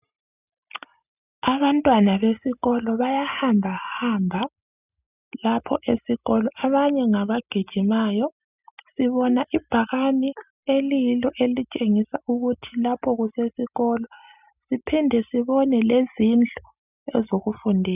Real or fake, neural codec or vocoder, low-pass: real; none; 3.6 kHz